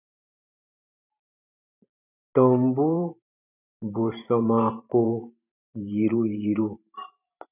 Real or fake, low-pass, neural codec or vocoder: fake; 3.6 kHz; vocoder, 44.1 kHz, 128 mel bands every 512 samples, BigVGAN v2